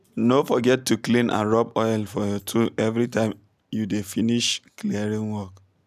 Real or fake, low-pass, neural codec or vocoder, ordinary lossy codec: real; 14.4 kHz; none; none